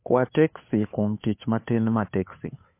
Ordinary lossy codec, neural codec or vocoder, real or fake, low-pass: MP3, 24 kbps; codec, 16 kHz, 2 kbps, FunCodec, trained on Chinese and English, 25 frames a second; fake; 3.6 kHz